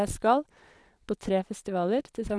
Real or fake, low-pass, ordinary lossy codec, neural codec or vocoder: fake; none; none; vocoder, 22.05 kHz, 80 mel bands, Vocos